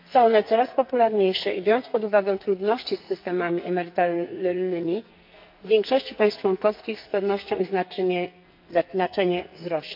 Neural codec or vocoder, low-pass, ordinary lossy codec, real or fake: codec, 44.1 kHz, 2.6 kbps, SNAC; 5.4 kHz; MP3, 48 kbps; fake